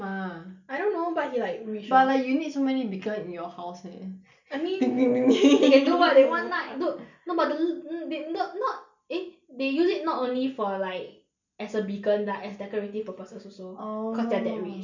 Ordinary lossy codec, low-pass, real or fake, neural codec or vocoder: none; 7.2 kHz; real; none